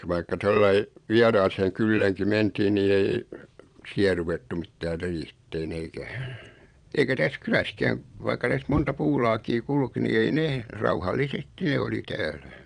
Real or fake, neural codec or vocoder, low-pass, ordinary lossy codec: fake; vocoder, 22.05 kHz, 80 mel bands, Vocos; 9.9 kHz; none